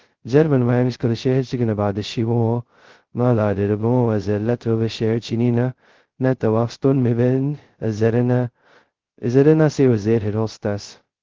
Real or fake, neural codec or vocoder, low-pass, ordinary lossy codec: fake; codec, 16 kHz, 0.2 kbps, FocalCodec; 7.2 kHz; Opus, 16 kbps